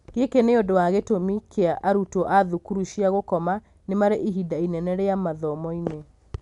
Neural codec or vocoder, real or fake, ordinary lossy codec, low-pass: none; real; none; 10.8 kHz